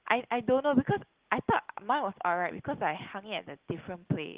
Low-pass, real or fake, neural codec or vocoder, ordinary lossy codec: 3.6 kHz; real; none; Opus, 16 kbps